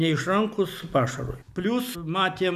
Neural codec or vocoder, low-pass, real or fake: none; 14.4 kHz; real